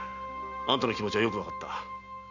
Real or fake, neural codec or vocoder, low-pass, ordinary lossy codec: real; none; 7.2 kHz; none